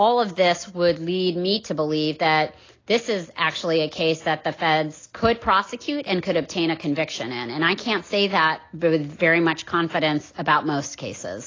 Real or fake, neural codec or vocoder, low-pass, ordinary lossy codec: real; none; 7.2 kHz; AAC, 32 kbps